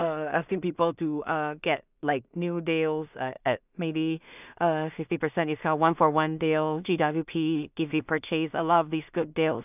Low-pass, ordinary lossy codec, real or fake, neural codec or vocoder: 3.6 kHz; none; fake; codec, 16 kHz in and 24 kHz out, 0.4 kbps, LongCat-Audio-Codec, two codebook decoder